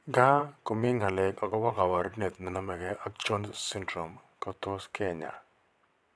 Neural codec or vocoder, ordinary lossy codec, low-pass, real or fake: vocoder, 22.05 kHz, 80 mel bands, WaveNeXt; none; none; fake